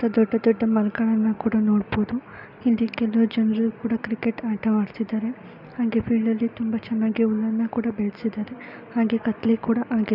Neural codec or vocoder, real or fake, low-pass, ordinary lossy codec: none; real; 5.4 kHz; none